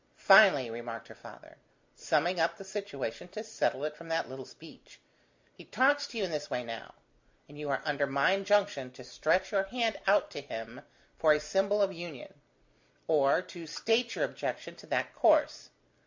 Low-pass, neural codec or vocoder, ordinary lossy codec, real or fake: 7.2 kHz; none; MP3, 64 kbps; real